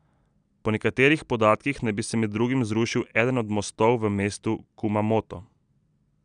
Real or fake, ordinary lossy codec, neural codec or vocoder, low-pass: real; none; none; 9.9 kHz